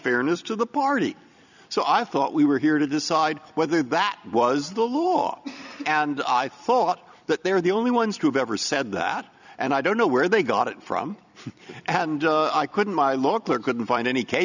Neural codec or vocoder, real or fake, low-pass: vocoder, 44.1 kHz, 128 mel bands every 256 samples, BigVGAN v2; fake; 7.2 kHz